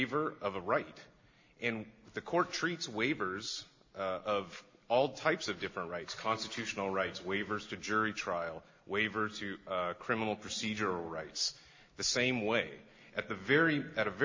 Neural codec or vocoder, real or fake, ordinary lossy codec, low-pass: none; real; MP3, 32 kbps; 7.2 kHz